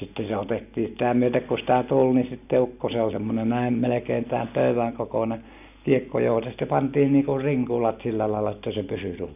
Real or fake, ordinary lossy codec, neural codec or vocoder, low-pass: real; none; none; 3.6 kHz